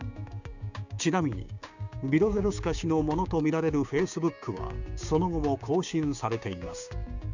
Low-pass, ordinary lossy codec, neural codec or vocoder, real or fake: 7.2 kHz; none; codec, 16 kHz, 6 kbps, DAC; fake